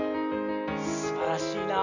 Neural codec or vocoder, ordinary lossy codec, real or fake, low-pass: none; none; real; 7.2 kHz